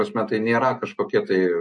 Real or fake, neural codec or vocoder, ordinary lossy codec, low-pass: real; none; MP3, 48 kbps; 10.8 kHz